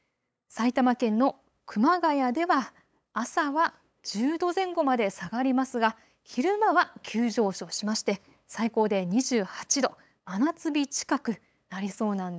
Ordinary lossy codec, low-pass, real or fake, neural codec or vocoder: none; none; fake; codec, 16 kHz, 8 kbps, FunCodec, trained on LibriTTS, 25 frames a second